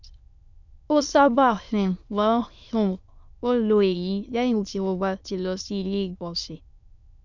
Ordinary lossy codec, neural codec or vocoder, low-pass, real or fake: none; autoencoder, 22.05 kHz, a latent of 192 numbers a frame, VITS, trained on many speakers; 7.2 kHz; fake